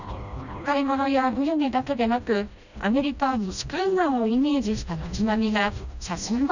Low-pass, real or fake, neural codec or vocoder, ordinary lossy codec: 7.2 kHz; fake; codec, 16 kHz, 1 kbps, FreqCodec, smaller model; none